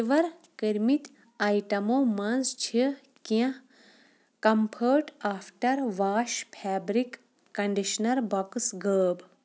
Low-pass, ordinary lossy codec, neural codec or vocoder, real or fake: none; none; none; real